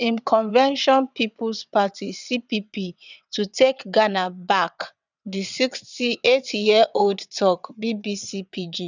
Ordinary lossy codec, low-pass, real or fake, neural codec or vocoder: none; 7.2 kHz; fake; vocoder, 22.05 kHz, 80 mel bands, WaveNeXt